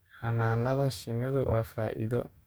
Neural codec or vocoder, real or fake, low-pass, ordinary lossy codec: codec, 44.1 kHz, 2.6 kbps, DAC; fake; none; none